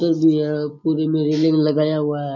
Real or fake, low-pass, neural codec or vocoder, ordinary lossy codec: real; 7.2 kHz; none; none